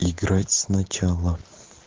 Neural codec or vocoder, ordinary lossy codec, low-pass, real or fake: none; Opus, 16 kbps; 7.2 kHz; real